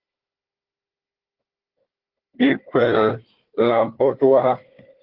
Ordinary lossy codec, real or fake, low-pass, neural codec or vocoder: Opus, 16 kbps; fake; 5.4 kHz; codec, 16 kHz, 4 kbps, FunCodec, trained on Chinese and English, 50 frames a second